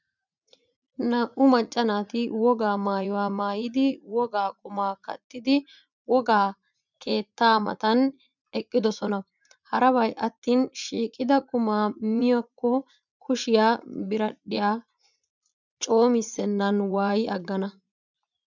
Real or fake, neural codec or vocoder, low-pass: fake; vocoder, 44.1 kHz, 80 mel bands, Vocos; 7.2 kHz